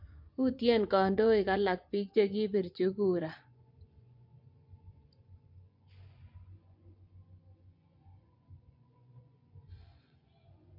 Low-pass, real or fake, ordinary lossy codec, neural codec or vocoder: 5.4 kHz; fake; MP3, 48 kbps; vocoder, 24 kHz, 100 mel bands, Vocos